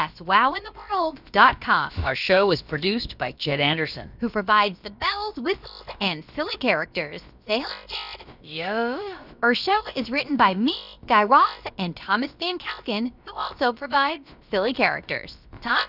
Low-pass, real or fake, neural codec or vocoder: 5.4 kHz; fake; codec, 16 kHz, about 1 kbps, DyCAST, with the encoder's durations